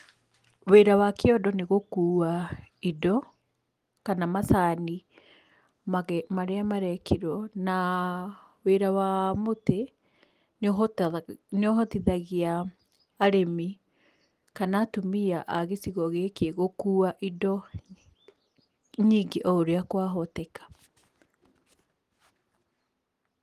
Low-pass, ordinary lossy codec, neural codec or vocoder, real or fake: 14.4 kHz; Opus, 24 kbps; none; real